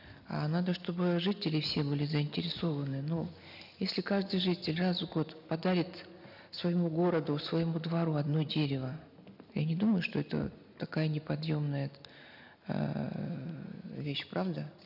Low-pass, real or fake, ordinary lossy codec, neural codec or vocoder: 5.4 kHz; real; none; none